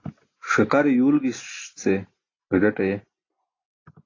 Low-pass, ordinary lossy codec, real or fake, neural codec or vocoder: 7.2 kHz; AAC, 32 kbps; real; none